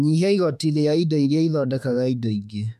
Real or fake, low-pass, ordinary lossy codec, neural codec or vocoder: fake; 14.4 kHz; none; autoencoder, 48 kHz, 32 numbers a frame, DAC-VAE, trained on Japanese speech